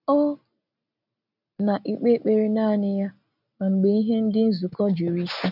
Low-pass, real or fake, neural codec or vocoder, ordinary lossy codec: 5.4 kHz; real; none; none